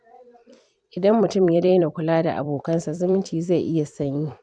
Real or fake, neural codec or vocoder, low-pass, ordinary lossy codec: fake; vocoder, 44.1 kHz, 128 mel bands every 256 samples, BigVGAN v2; 9.9 kHz; none